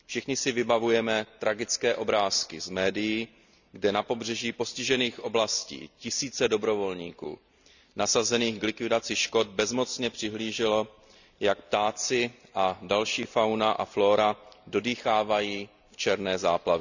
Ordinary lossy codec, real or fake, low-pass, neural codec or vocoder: none; real; 7.2 kHz; none